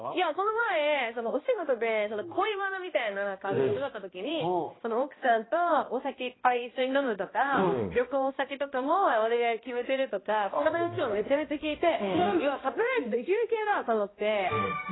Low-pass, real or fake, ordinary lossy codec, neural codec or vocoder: 7.2 kHz; fake; AAC, 16 kbps; codec, 16 kHz, 1 kbps, X-Codec, HuBERT features, trained on balanced general audio